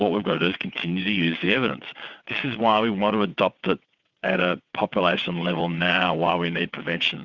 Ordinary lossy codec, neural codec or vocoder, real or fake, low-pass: Opus, 64 kbps; vocoder, 22.05 kHz, 80 mel bands, WaveNeXt; fake; 7.2 kHz